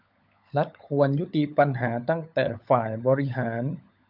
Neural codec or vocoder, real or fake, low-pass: codec, 16 kHz, 16 kbps, FunCodec, trained on LibriTTS, 50 frames a second; fake; 5.4 kHz